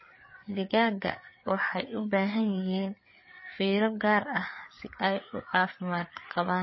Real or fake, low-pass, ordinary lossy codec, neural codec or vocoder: fake; 7.2 kHz; MP3, 24 kbps; codec, 16 kHz, 4 kbps, FreqCodec, larger model